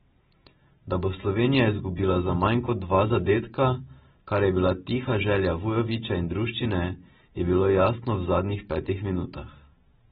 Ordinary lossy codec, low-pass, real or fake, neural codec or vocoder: AAC, 16 kbps; 19.8 kHz; real; none